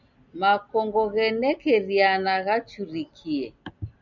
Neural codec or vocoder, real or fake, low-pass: none; real; 7.2 kHz